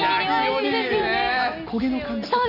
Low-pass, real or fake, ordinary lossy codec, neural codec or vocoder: 5.4 kHz; real; none; none